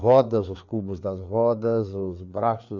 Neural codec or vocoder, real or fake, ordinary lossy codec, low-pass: codec, 16 kHz, 4 kbps, FreqCodec, larger model; fake; none; 7.2 kHz